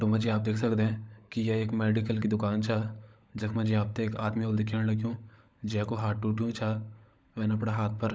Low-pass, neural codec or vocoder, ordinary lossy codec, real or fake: none; codec, 16 kHz, 16 kbps, FunCodec, trained on LibriTTS, 50 frames a second; none; fake